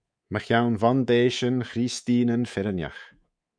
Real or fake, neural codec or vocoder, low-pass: fake; codec, 24 kHz, 3.1 kbps, DualCodec; 9.9 kHz